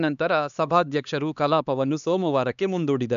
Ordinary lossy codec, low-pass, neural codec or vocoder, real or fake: none; 7.2 kHz; codec, 16 kHz, 2 kbps, X-Codec, HuBERT features, trained on LibriSpeech; fake